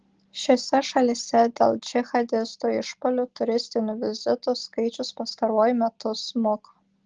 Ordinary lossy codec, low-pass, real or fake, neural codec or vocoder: Opus, 16 kbps; 7.2 kHz; real; none